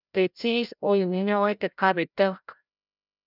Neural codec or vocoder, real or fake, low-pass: codec, 16 kHz, 0.5 kbps, FreqCodec, larger model; fake; 5.4 kHz